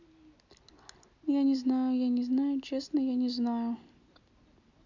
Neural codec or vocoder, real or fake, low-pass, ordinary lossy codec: none; real; 7.2 kHz; none